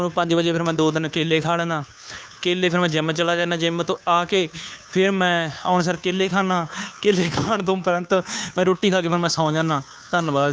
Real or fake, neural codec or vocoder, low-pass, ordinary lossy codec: fake; codec, 16 kHz, 2 kbps, FunCodec, trained on Chinese and English, 25 frames a second; none; none